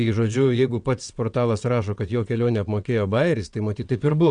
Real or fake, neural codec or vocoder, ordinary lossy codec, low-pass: fake; vocoder, 22.05 kHz, 80 mel bands, WaveNeXt; MP3, 96 kbps; 9.9 kHz